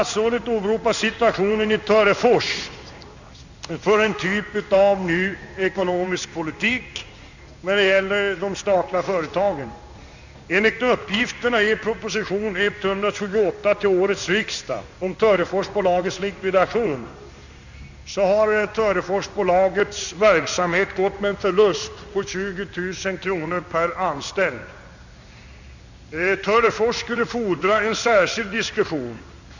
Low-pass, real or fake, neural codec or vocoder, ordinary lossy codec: 7.2 kHz; fake; codec, 16 kHz in and 24 kHz out, 1 kbps, XY-Tokenizer; none